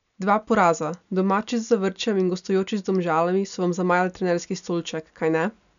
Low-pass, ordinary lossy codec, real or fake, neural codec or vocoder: 7.2 kHz; none; real; none